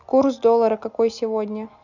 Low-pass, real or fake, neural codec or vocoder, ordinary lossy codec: 7.2 kHz; real; none; none